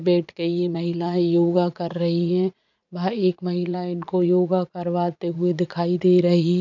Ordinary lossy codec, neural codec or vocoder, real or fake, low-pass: none; vocoder, 44.1 kHz, 80 mel bands, Vocos; fake; 7.2 kHz